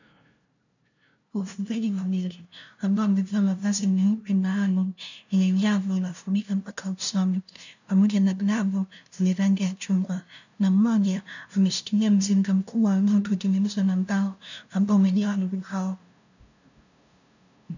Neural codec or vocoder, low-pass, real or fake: codec, 16 kHz, 0.5 kbps, FunCodec, trained on LibriTTS, 25 frames a second; 7.2 kHz; fake